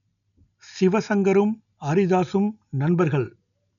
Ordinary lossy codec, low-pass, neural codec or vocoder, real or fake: none; 7.2 kHz; none; real